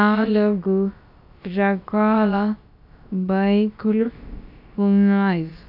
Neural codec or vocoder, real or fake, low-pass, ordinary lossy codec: codec, 16 kHz, about 1 kbps, DyCAST, with the encoder's durations; fake; 5.4 kHz; none